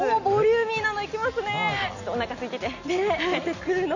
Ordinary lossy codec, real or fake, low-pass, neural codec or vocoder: none; real; 7.2 kHz; none